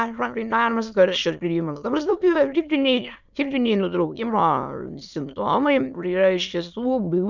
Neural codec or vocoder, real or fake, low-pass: autoencoder, 22.05 kHz, a latent of 192 numbers a frame, VITS, trained on many speakers; fake; 7.2 kHz